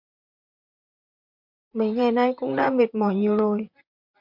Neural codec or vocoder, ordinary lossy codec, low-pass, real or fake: vocoder, 44.1 kHz, 128 mel bands, Pupu-Vocoder; MP3, 48 kbps; 5.4 kHz; fake